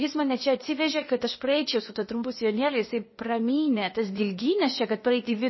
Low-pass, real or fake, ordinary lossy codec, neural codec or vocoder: 7.2 kHz; fake; MP3, 24 kbps; codec, 16 kHz, 0.8 kbps, ZipCodec